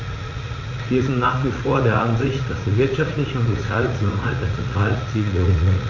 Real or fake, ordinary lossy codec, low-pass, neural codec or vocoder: fake; none; 7.2 kHz; vocoder, 44.1 kHz, 80 mel bands, Vocos